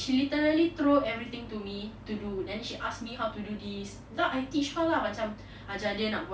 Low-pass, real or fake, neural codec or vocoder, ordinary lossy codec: none; real; none; none